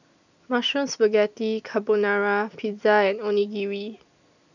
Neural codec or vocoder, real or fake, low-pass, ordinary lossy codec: none; real; 7.2 kHz; none